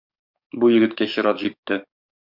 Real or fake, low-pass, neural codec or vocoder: fake; 5.4 kHz; codec, 44.1 kHz, 7.8 kbps, DAC